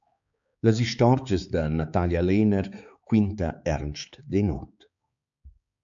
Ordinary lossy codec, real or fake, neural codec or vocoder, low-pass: MP3, 64 kbps; fake; codec, 16 kHz, 4 kbps, X-Codec, HuBERT features, trained on balanced general audio; 7.2 kHz